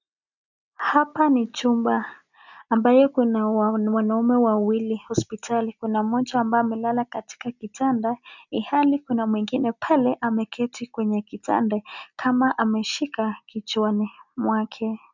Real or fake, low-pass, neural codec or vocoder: real; 7.2 kHz; none